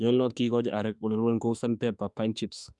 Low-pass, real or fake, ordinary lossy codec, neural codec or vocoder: 10.8 kHz; fake; none; autoencoder, 48 kHz, 32 numbers a frame, DAC-VAE, trained on Japanese speech